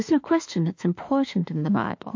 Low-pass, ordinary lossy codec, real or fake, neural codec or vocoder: 7.2 kHz; MP3, 48 kbps; fake; autoencoder, 48 kHz, 32 numbers a frame, DAC-VAE, trained on Japanese speech